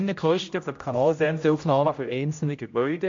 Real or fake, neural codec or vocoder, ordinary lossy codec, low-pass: fake; codec, 16 kHz, 0.5 kbps, X-Codec, HuBERT features, trained on general audio; MP3, 48 kbps; 7.2 kHz